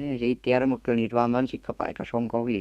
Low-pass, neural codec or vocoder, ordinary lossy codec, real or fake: 14.4 kHz; codec, 32 kHz, 1.9 kbps, SNAC; Opus, 64 kbps; fake